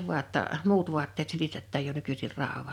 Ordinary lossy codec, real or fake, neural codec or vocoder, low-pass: none; fake; vocoder, 44.1 kHz, 128 mel bands every 256 samples, BigVGAN v2; 19.8 kHz